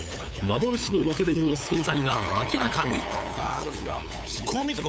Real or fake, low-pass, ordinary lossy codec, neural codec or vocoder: fake; none; none; codec, 16 kHz, 8 kbps, FunCodec, trained on LibriTTS, 25 frames a second